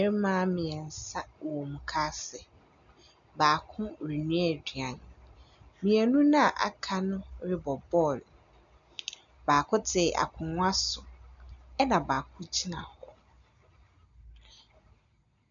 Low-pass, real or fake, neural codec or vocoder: 7.2 kHz; real; none